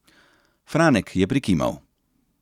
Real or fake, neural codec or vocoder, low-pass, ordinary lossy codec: real; none; 19.8 kHz; none